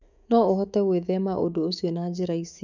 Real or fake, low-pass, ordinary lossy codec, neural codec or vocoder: fake; 7.2 kHz; none; autoencoder, 48 kHz, 128 numbers a frame, DAC-VAE, trained on Japanese speech